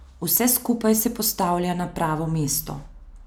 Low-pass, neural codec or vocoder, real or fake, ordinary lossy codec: none; none; real; none